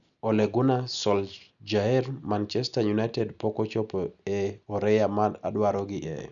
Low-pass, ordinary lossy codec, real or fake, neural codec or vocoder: 7.2 kHz; none; real; none